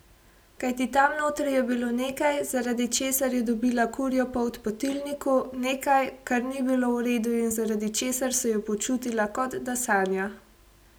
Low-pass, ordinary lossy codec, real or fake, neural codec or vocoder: none; none; real; none